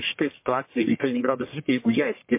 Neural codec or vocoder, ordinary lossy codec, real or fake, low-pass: codec, 44.1 kHz, 1.7 kbps, Pupu-Codec; MP3, 24 kbps; fake; 3.6 kHz